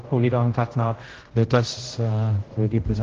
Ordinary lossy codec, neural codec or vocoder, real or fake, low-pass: Opus, 16 kbps; codec, 16 kHz, 0.5 kbps, X-Codec, HuBERT features, trained on general audio; fake; 7.2 kHz